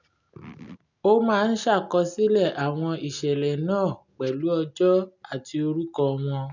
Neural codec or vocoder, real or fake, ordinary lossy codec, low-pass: none; real; none; 7.2 kHz